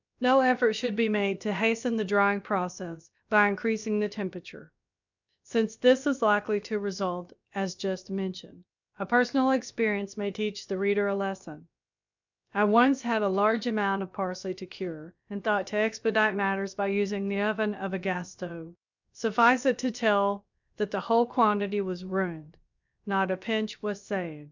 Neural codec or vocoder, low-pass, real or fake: codec, 16 kHz, about 1 kbps, DyCAST, with the encoder's durations; 7.2 kHz; fake